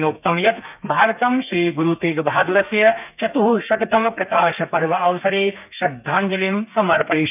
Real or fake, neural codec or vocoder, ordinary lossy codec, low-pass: fake; codec, 32 kHz, 1.9 kbps, SNAC; none; 3.6 kHz